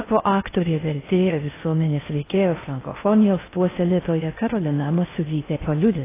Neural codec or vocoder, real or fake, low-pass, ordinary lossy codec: codec, 16 kHz in and 24 kHz out, 0.6 kbps, FocalCodec, streaming, 4096 codes; fake; 3.6 kHz; AAC, 16 kbps